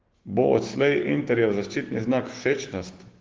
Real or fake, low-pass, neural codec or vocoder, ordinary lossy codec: fake; 7.2 kHz; codec, 16 kHz, 6 kbps, DAC; Opus, 16 kbps